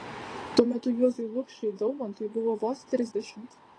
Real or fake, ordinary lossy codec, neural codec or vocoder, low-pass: fake; AAC, 32 kbps; vocoder, 22.05 kHz, 80 mel bands, WaveNeXt; 9.9 kHz